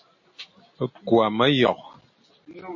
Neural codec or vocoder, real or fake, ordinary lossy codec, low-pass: none; real; MP3, 32 kbps; 7.2 kHz